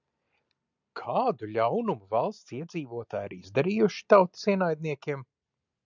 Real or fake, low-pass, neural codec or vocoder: real; 7.2 kHz; none